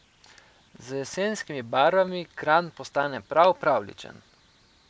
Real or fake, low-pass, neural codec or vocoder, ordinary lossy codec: real; none; none; none